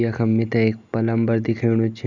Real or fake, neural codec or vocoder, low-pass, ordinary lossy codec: real; none; 7.2 kHz; none